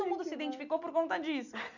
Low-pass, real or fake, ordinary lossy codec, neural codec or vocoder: 7.2 kHz; real; none; none